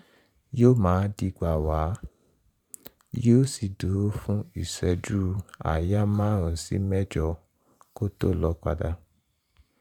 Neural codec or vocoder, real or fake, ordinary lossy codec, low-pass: vocoder, 44.1 kHz, 128 mel bands every 512 samples, BigVGAN v2; fake; none; 19.8 kHz